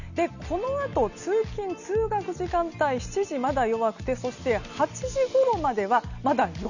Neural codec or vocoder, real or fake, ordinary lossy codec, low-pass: none; real; none; 7.2 kHz